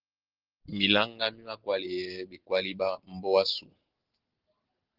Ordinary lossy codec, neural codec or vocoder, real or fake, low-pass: Opus, 16 kbps; none; real; 5.4 kHz